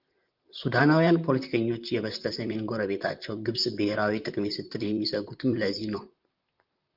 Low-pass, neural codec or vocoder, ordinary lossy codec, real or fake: 5.4 kHz; vocoder, 44.1 kHz, 128 mel bands, Pupu-Vocoder; Opus, 24 kbps; fake